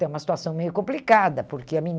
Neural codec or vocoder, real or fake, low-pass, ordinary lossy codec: none; real; none; none